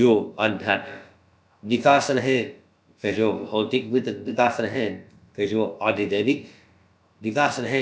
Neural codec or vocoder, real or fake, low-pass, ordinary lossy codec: codec, 16 kHz, about 1 kbps, DyCAST, with the encoder's durations; fake; none; none